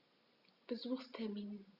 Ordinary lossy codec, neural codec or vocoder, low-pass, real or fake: Opus, 64 kbps; none; 5.4 kHz; real